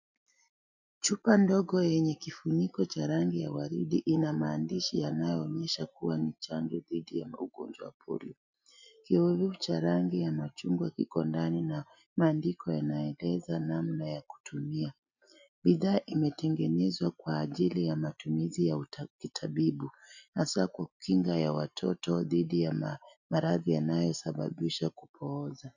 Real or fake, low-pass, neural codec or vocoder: real; 7.2 kHz; none